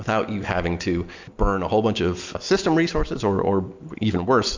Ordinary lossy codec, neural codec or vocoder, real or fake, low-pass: MP3, 64 kbps; none; real; 7.2 kHz